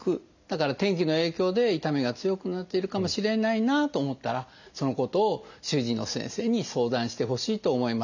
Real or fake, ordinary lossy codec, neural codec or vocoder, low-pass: real; none; none; 7.2 kHz